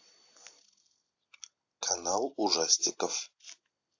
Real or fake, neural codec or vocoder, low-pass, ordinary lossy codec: real; none; 7.2 kHz; AAC, 48 kbps